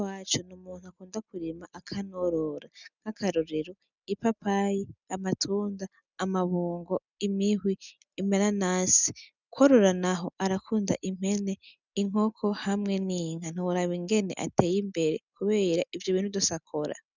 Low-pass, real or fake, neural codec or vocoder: 7.2 kHz; real; none